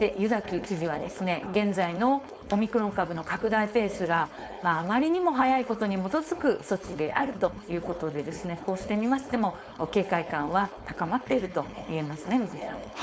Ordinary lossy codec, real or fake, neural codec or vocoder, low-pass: none; fake; codec, 16 kHz, 4.8 kbps, FACodec; none